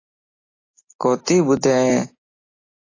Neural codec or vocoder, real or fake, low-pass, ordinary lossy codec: none; real; 7.2 kHz; AAC, 32 kbps